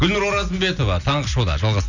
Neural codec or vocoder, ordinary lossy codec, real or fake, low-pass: none; none; real; 7.2 kHz